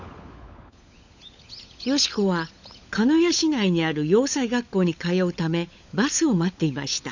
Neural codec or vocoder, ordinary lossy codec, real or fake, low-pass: codec, 16 kHz, 16 kbps, FunCodec, trained on LibriTTS, 50 frames a second; none; fake; 7.2 kHz